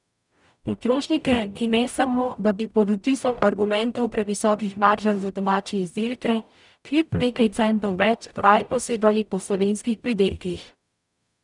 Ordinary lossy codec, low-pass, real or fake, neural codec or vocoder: none; 10.8 kHz; fake; codec, 44.1 kHz, 0.9 kbps, DAC